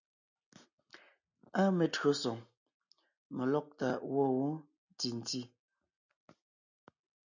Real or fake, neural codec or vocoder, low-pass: real; none; 7.2 kHz